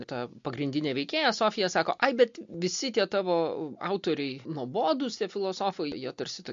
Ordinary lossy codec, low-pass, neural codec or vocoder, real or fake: MP3, 48 kbps; 7.2 kHz; none; real